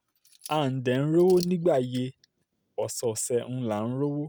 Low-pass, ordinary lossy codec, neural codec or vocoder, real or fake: none; none; none; real